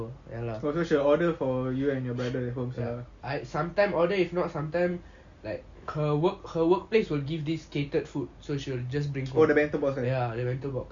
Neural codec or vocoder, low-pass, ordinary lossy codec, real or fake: none; 7.2 kHz; Opus, 64 kbps; real